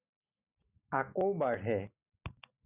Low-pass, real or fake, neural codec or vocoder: 3.6 kHz; real; none